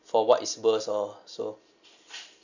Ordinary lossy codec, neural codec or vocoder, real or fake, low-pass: none; none; real; 7.2 kHz